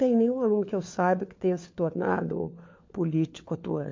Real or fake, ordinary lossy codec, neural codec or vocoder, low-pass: fake; MP3, 48 kbps; codec, 16 kHz, 4 kbps, FunCodec, trained on LibriTTS, 50 frames a second; 7.2 kHz